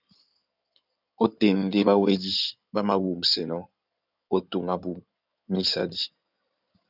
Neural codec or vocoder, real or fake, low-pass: codec, 16 kHz in and 24 kHz out, 2.2 kbps, FireRedTTS-2 codec; fake; 5.4 kHz